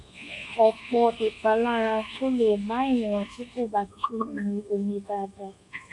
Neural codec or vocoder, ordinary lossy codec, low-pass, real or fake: codec, 24 kHz, 1.2 kbps, DualCodec; MP3, 64 kbps; 10.8 kHz; fake